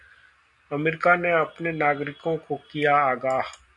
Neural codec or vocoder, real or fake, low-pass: none; real; 10.8 kHz